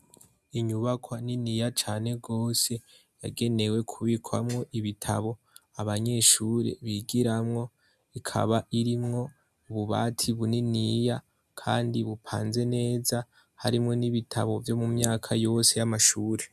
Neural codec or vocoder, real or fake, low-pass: none; real; 14.4 kHz